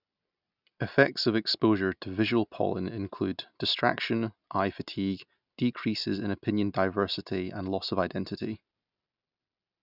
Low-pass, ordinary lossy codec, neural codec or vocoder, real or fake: 5.4 kHz; none; none; real